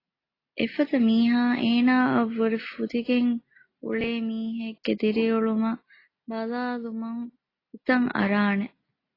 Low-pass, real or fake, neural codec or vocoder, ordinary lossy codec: 5.4 kHz; real; none; AAC, 24 kbps